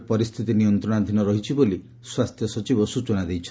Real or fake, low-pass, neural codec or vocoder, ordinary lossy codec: real; none; none; none